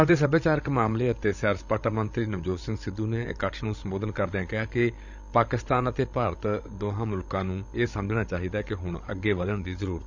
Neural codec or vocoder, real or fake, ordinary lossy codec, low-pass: codec, 16 kHz, 16 kbps, FreqCodec, larger model; fake; none; 7.2 kHz